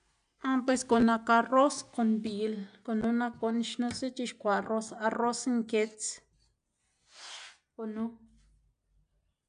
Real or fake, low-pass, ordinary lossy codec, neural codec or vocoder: real; 9.9 kHz; none; none